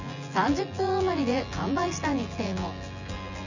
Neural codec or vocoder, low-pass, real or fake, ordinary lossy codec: vocoder, 24 kHz, 100 mel bands, Vocos; 7.2 kHz; fake; none